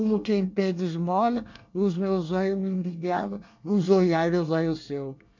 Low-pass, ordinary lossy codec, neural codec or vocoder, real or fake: 7.2 kHz; MP3, 64 kbps; codec, 24 kHz, 1 kbps, SNAC; fake